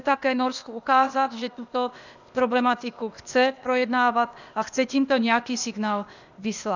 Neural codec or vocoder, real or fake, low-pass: codec, 16 kHz, 0.8 kbps, ZipCodec; fake; 7.2 kHz